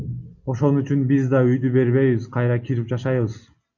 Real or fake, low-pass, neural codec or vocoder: real; 7.2 kHz; none